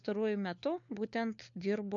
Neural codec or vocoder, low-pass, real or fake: codec, 16 kHz, 4 kbps, FunCodec, trained on LibriTTS, 50 frames a second; 7.2 kHz; fake